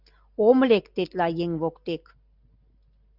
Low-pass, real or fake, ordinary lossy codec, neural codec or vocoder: 5.4 kHz; real; Opus, 64 kbps; none